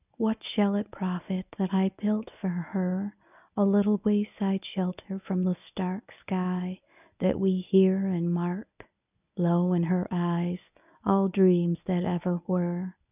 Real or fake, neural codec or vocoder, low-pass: fake; codec, 24 kHz, 0.9 kbps, WavTokenizer, medium speech release version 1; 3.6 kHz